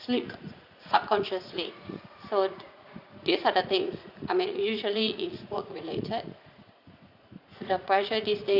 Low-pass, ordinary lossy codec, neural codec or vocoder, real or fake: 5.4 kHz; Opus, 64 kbps; vocoder, 44.1 kHz, 80 mel bands, Vocos; fake